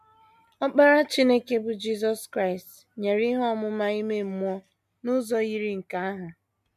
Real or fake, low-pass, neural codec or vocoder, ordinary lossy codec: real; 14.4 kHz; none; MP3, 96 kbps